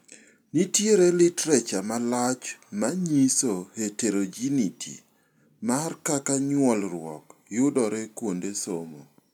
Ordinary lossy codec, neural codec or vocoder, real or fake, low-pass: none; none; real; 19.8 kHz